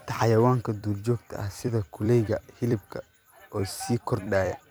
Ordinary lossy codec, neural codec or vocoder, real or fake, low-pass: none; vocoder, 44.1 kHz, 128 mel bands every 256 samples, BigVGAN v2; fake; none